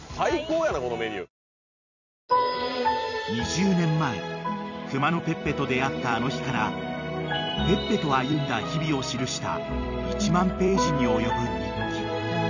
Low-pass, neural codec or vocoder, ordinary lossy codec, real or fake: 7.2 kHz; vocoder, 44.1 kHz, 128 mel bands every 256 samples, BigVGAN v2; none; fake